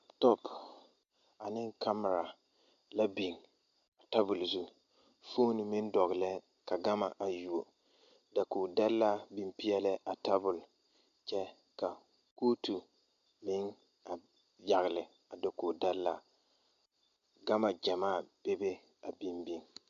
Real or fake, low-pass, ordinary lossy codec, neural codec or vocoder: real; 7.2 kHz; AAC, 64 kbps; none